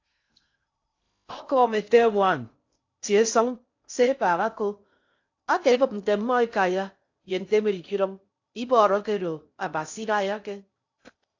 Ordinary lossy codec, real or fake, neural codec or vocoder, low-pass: AAC, 48 kbps; fake; codec, 16 kHz in and 24 kHz out, 0.6 kbps, FocalCodec, streaming, 2048 codes; 7.2 kHz